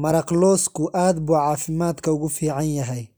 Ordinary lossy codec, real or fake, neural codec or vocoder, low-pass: none; real; none; none